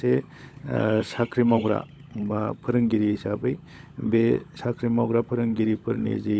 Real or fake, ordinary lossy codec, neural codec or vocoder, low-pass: fake; none; codec, 16 kHz, 16 kbps, FunCodec, trained on LibriTTS, 50 frames a second; none